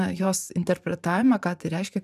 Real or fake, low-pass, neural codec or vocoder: fake; 14.4 kHz; vocoder, 44.1 kHz, 128 mel bands every 512 samples, BigVGAN v2